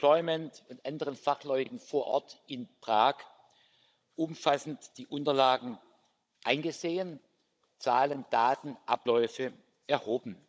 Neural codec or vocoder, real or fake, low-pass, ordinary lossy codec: codec, 16 kHz, 16 kbps, FunCodec, trained on Chinese and English, 50 frames a second; fake; none; none